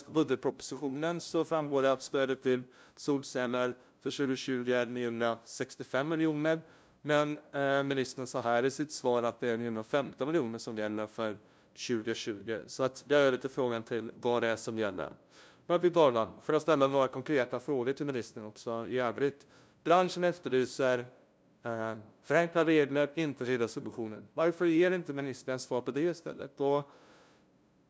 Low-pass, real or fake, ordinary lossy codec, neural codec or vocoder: none; fake; none; codec, 16 kHz, 0.5 kbps, FunCodec, trained on LibriTTS, 25 frames a second